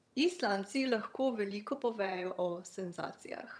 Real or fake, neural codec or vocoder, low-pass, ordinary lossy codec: fake; vocoder, 22.05 kHz, 80 mel bands, HiFi-GAN; none; none